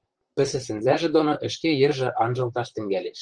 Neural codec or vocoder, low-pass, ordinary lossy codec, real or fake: vocoder, 44.1 kHz, 128 mel bands, Pupu-Vocoder; 9.9 kHz; Opus, 24 kbps; fake